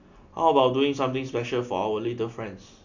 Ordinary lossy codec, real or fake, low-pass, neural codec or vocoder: none; real; 7.2 kHz; none